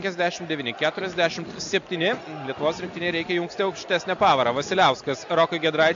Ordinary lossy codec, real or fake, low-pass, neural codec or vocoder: AAC, 64 kbps; real; 7.2 kHz; none